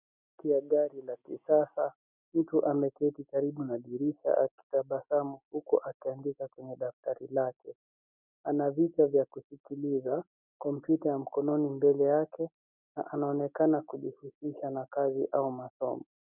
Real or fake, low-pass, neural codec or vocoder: real; 3.6 kHz; none